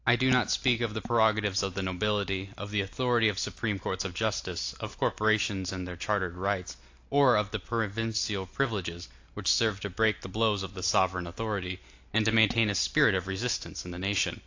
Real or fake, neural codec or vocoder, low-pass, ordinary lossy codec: real; none; 7.2 kHz; AAC, 48 kbps